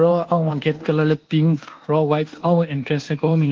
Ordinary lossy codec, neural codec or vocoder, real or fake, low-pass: Opus, 16 kbps; codec, 24 kHz, 1.2 kbps, DualCodec; fake; 7.2 kHz